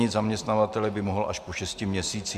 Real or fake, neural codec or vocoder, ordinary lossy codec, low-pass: real; none; MP3, 96 kbps; 14.4 kHz